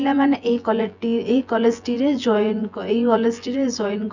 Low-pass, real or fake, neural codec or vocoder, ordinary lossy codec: 7.2 kHz; fake; vocoder, 24 kHz, 100 mel bands, Vocos; none